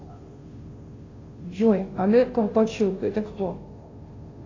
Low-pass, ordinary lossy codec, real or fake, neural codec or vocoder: 7.2 kHz; AAC, 32 kbps; fake; codec, 16 kHz, 0.5 kbps, FunCodec, trained on Chinese and English, 25 frames a second